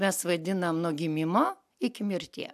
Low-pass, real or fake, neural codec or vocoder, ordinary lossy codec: 14.4 kHz; real; none; AAC, 96 kbps